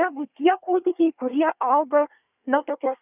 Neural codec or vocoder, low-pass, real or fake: codec, 24 kHz, 1 kbps, SNAC; 3.6 kHz; fake